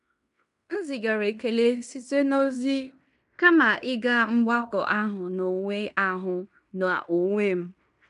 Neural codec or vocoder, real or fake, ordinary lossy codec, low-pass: codec, 16 kHz in and 24 kHz out, 0.9 kbps, LongCat-Audio-Codec, fine tuned four codebook decoder; fake; none; 10.8 kHz